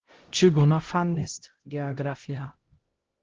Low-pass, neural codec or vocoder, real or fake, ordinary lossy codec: 7.2 kHz; codec, 16 kHz, 0.5 kbps, X-Codec, HuBERT features, trained on LibriSpeech; fake; Opus, 16 kbps